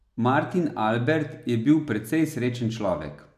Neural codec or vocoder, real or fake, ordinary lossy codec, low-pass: none; real; none; 14.4 kHz